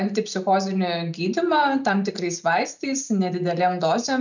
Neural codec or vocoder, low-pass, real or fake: none; 7.2 kHz; real